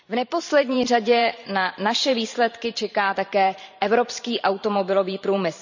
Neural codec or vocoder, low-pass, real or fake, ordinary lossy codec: vocoder, 44.1 kHz, 128 mel bands every 512 samples, BigVGAN v2; 7.2 kHz; fake; none